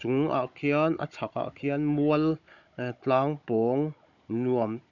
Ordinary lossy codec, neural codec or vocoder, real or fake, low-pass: none; codec, 16 kHz, 16 kbps, FunCodec, trained on Chinese and English, 50 frames a second; fake; 7.2 kHz